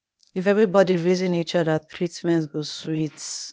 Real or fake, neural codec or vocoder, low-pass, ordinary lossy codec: fake; codec, 16 kHz, 0.8 kbps, ZipCodec; none; none